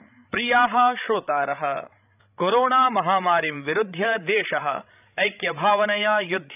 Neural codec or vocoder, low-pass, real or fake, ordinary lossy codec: codec, 16 kHz, 16 kbps, FreqCodec, larger model; 3.6 kHz; fake; none